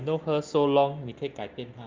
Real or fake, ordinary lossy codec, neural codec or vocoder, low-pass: real; Opus, 24 kbps; none; 7.2 kHz